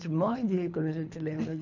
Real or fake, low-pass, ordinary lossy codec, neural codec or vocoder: fake; 7.2 kHz; none; codec, 24 kHz, 3 kbps, HILCodec